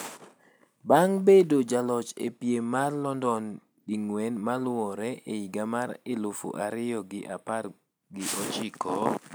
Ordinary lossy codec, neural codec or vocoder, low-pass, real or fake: none; none; none; real